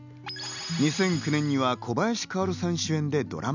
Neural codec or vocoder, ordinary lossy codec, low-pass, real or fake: none; none; 7.2 kHz; real